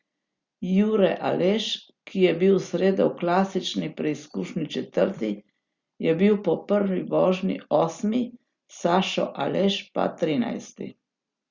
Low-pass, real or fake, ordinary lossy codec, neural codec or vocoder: 7.2 kHz; real; Opus, 64 kbps; none